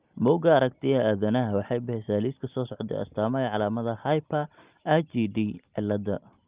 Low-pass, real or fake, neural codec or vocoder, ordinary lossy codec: 3.6 kHz; real; none; Opus, 24 kbps